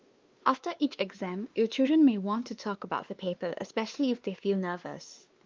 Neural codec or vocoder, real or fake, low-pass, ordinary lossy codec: codec, 16 kHz, 2 kbps, X-Codec, WavLM features, trained on Multilingual LibriSpeech; fake; 7.2 kHz; Opus, 32 kbps